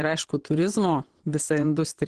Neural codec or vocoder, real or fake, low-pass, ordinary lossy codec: vocoder, 22.05 kHz, 80 mel bands, WaveNeXt; fake; 9.9 kHz; Opus, 16 kbps